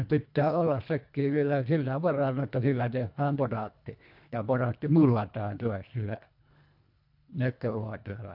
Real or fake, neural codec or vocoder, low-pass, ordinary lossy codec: fake; codec, 24 kHz, 1.5 kbps, HILCodec; 5.4 kHz; MP3, 48 kbps